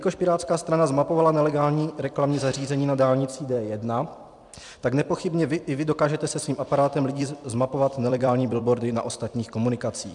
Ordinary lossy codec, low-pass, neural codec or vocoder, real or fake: MP3, 96 kbps; 10.8 kHz; vocoder, 44.1 kHz, 128 mel bands every 256 samples, BigVGAN v2; fake